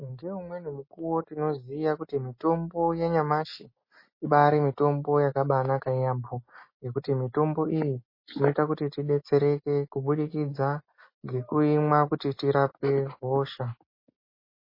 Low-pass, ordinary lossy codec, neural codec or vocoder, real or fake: 5.4 kHz; MP3, 24 kbps; none; real